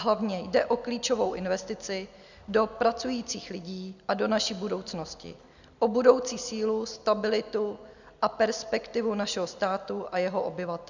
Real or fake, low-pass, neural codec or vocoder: real; 7.2 kHz; none